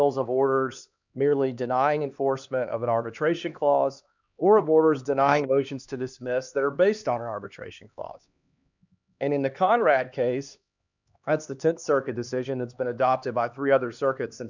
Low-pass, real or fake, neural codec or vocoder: 7.2 kHz; fake; codec, 16 kHz, 2 kbps, X-Codec, HuBERT features, trained on LibriSpeech